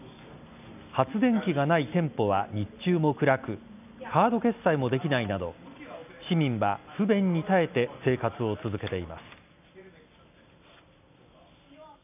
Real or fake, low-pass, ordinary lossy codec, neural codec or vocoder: real; 3.6 kHz; none; none